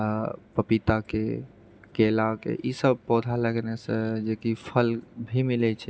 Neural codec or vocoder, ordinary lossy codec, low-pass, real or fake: none; none; none; real